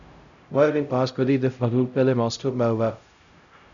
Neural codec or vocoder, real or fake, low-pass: codec, 16 kHz, 0.5 kbps, X-Codec, HuBERT features, trained on LibriSpeech; fake; 7.2 kHz